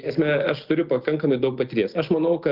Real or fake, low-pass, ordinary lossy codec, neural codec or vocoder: real; 5.4 kHz; Opus, 24 kbps; none